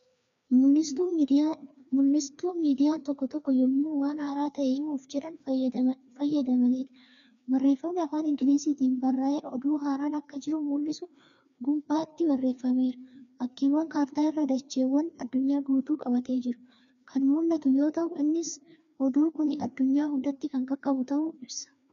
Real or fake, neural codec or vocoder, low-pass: fake; codec, 16 kHz, 2 kbps, FreqCodec, larger model; 7.2 kHz